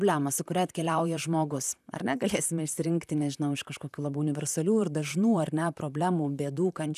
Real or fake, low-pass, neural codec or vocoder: fake; 14.4 kHz; vocoder, 44.1 kHz, 128 mel bands, Pupu-Vocoder